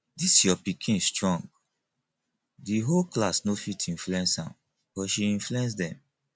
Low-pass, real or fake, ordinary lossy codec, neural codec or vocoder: none; real; none; none